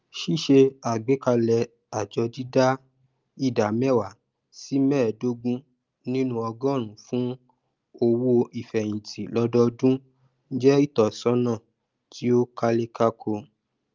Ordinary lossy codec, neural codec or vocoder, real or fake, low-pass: Opus, 24 kbps; none; real; 7.2 kHz